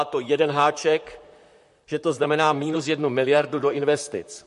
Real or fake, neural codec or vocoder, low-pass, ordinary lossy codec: fake; vocoder, 44.1 kHz, 128 mel bands, Pupu-Vocoder; 14.4 kHz; MP3, 48 kbps